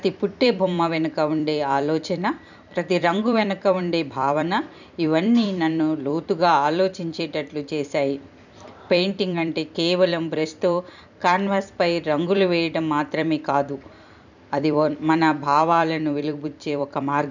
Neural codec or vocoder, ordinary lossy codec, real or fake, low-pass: vocoder, 44.1 kHz, 128 mel bands every 256 samples, BigVGAN v2; none; fake; 7.2 kHz